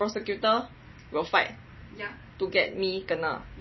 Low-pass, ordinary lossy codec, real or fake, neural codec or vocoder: 7.2 kHz; MP3, 24 kbps; real; none